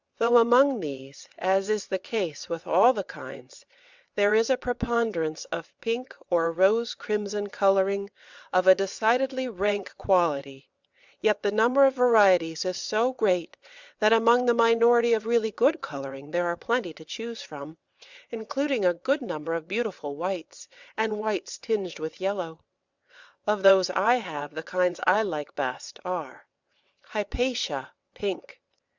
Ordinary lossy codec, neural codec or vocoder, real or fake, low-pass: Opus, 64 kbps; vocoder, 22.05 kHz, 80 mel bands, WaveNeXt; fake; 7.2 kHz